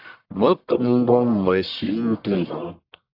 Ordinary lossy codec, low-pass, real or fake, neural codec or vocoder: AAC, 48 kbps; 5.4 kHz; fake; codec, 44.1 kHz, 1.7 kbps, Pupu-Codec